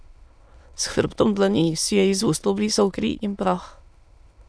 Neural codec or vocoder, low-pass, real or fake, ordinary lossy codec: autoencoder, 22.05 kHz, a latent of 192 numbers a frame, VITS, trained on many speakers; none; fake; none